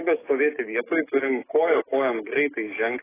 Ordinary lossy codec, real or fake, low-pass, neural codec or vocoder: AAC, 16 kbps; fake; 3.6 kHz; codec, 44.1 kHz, 7.8 kbps, DAC